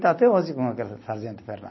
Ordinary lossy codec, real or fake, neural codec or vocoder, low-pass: MP3, 24 kbps; real; none; 7.2 kHz